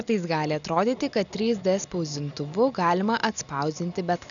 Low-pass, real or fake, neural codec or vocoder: 7.2 kHz; real; none